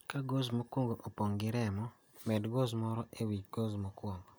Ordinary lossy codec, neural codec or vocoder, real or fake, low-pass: none; none; real; none